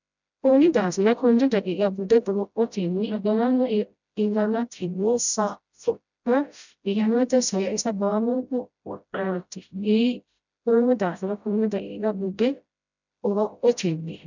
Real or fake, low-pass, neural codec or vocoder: fake; 7.2 kHz; codec, 16 kHz, 0.5 kbps, FreqCodec, smaller model